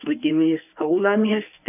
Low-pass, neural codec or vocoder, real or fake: 3.6 kHz; codec, 24 kHz, 0.9 kbps, WavTokenizer, medium speech release version 1; fake